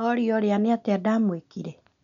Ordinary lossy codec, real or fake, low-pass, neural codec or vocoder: none; real; 7.2 kHz; none